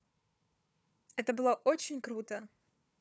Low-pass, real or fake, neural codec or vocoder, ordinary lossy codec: none; fake; codec, 16 kHz, 4 kbps, FunCodec, trained on Chinese and English, 50 frames a second; none